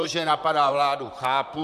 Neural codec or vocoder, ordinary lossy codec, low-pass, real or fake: vocoder, 44.1 kHz, 128 mel bands, Pupu-Vocoder; MP3, 96 kbps; 14.4 kHz; fake